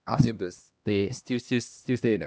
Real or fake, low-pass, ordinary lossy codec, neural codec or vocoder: fake; none; none; codec, 16 kHz, 1 kbps, X-Codec, HuBERT features, trained on LibriSpeech